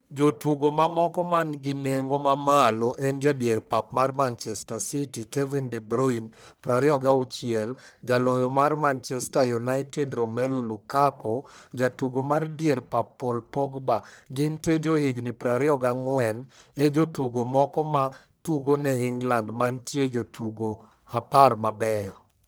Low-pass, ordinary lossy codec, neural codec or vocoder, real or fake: none; none; codec, 44.1 kHz, 1.7 kbps, Pupu-Codec; fake